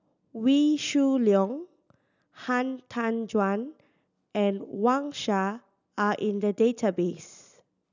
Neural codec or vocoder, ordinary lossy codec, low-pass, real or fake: none; none; 7.2 kHz; real